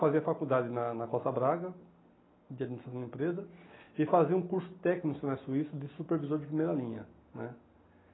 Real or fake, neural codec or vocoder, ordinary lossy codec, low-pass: real; none; AAC, 16 kbps; 7.2 kHz